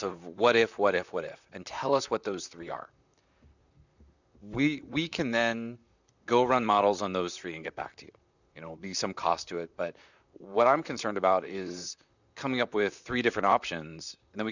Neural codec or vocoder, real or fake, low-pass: vocoder, 44.1 kHz, 128 mel bands, Pupu-Vocoder; fake; 7.2 kHz